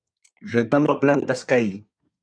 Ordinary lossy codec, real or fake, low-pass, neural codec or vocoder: MP3, 96 kbps; fake; 9.9 kHz; codec, 24 kHz, 1 kbps, SNAC